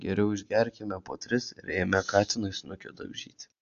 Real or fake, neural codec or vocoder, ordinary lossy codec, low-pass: real; none; AAC, 48 kbps; 7.2 kHz